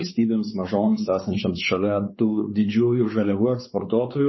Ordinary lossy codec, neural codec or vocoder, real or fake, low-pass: MP3, 24 kbps; codec, 16 kHz, 4 kbps, X-Codec, WavLM features, trained on Multilingual LibriSpeech; fake; 7.2 kHz